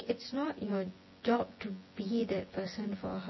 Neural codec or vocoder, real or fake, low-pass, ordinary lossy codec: vocoder, 24 kHz, 100 mel bands, Vocos; fake; 7.2 kHz; MP3, 24 kbps